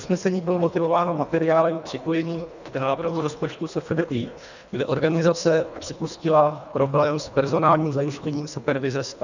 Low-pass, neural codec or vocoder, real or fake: 7.2 kHz; codec, 24 kHz, 1.5 kbps, HILCodec; fake